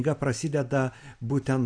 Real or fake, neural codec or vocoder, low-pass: real; none; 9.9 kHz